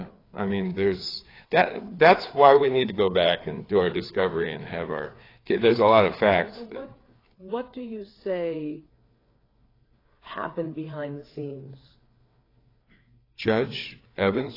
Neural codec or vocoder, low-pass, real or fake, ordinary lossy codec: codec, 16 kHz, 4 kbps, FreqCodec, larger model; 5.4 kHz; fake; AAC, 24 kbps